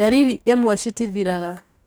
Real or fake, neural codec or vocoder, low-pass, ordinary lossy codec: fake; codec, 44.1 kHz, 2.6 kbps, DAC; none; none